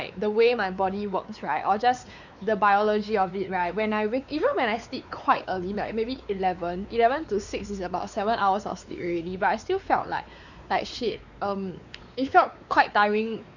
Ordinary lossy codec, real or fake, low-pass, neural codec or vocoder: none; fake; 7.2 kHz; codec, 16 kHz, 4 kbps, X-Codec, WavLM features, trained on Multilingual LibriSpeech